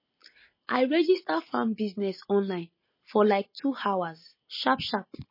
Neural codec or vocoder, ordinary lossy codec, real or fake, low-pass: none; MP3, 24 kbps; real; 5.4 kHz